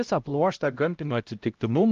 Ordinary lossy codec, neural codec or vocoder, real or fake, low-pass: Opus, 32 kbps; codec, 16 kHz, 0.5 kbps, X-Codec, HuBERT features, trained on LibriSpeech; fake; 7.2 kHz